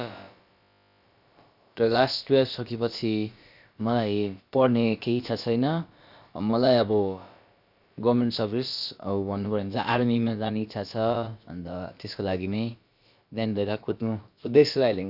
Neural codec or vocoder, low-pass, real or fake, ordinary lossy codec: codec, 16 kHz, about 1 kbps, DyCAST, with the encoder's durations; 5.4 kHz; fake; none